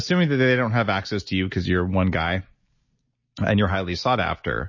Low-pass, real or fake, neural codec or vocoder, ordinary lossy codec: 7.2 kHz; real; none; MP3, 32 kbps